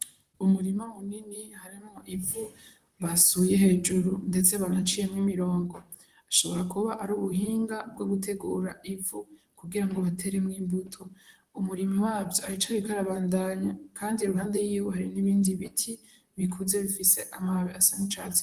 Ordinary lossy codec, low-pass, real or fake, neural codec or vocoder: Opus, 24 kbps; 14.4 kHz; fake; vocoder, 44.1 kHz, 128 mel bands, Pupu-Vocoder